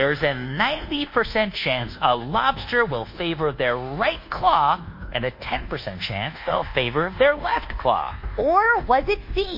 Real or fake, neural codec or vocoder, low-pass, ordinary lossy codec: fake; codec, 24 kHz, 1.2 kbps, DualCodec; 5.4 kHz; MP3, 32 kbps